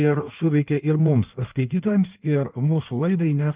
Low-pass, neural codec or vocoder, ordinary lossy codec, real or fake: 3.6 kHz; codec, 16 kHz in and 24 kHz out, 1.1 kbps, FireRedTTS-2 codec; Opus, 24 kbps; fake